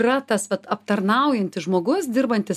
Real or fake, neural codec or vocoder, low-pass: real; none; 14.4 kHz